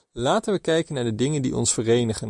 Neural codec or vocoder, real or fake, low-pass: none; real; 10.8 kHz